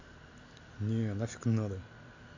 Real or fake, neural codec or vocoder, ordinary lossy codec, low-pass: real; none; AAC, 48 kbps; 7.2 kHz